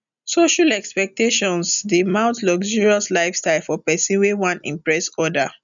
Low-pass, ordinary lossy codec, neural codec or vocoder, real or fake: 7.2 kHz; none; none; real